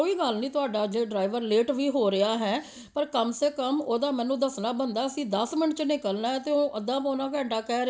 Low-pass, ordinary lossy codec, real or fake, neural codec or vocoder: none; none; real; none